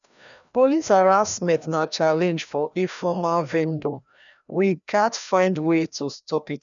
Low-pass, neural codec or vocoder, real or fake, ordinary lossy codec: 7.2 kHz; codec, 16 kHz, 1 kbps, FreqCodec, larger model; fake; none